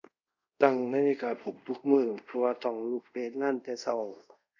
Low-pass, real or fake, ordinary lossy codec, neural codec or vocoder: 7.2 kHz; fake; none; codec, 24 kHz, 0.5 kbps, DualCodec